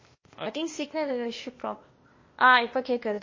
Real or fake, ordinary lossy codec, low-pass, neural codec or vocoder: fake; MP3, 32 kbps; 7.2 kHz; codec, 16 kHz, 0.8 kbps, ZipCodec